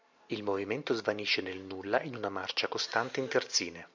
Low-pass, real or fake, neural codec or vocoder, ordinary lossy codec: 7.2 kHz; real; none; MP3, 64 kbps